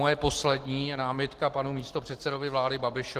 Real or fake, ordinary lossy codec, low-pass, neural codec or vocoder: real; Opus, 16 kbps; 14.4 kHz; none